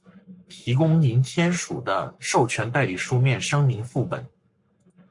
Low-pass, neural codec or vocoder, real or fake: 10.8 kHz; codec, 44.1 kHz, 7.8 kbps, Pupu-Codec; fake